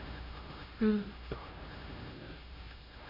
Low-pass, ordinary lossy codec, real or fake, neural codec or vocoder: 5.4 kHz; none; fake; codec, 16 kHz, 0.5 kbps, X-Codec, HuBERT features, trained on LibriSpeech